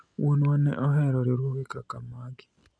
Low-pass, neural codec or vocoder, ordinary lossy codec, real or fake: 9.9 kHz; none; none; real